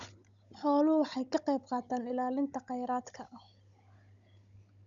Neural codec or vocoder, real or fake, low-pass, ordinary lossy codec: codec, 16 kHz, 16 kbps, FunCodec, trained on Chinese and English, 50 frames a second; fake; 7.2 kHz; none